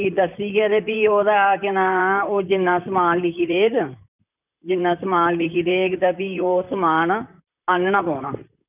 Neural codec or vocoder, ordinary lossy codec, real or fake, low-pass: vocoder, 44.1 kHz, 128 mel bands, Pupu-Vocoder; none; fake; 3.6 kHz